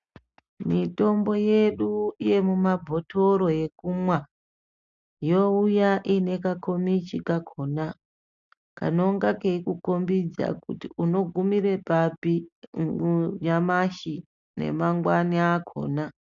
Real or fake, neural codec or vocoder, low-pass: real; none; 7.2 kHz